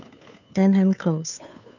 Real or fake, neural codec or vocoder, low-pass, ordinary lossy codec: fake; codec, 16 kHz, 2 kbps, FunCodec, trained on Chinese and English, 25 frames a second; 7.2 kHz; none